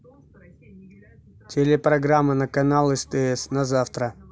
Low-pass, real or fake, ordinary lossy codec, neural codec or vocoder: none; real; none; none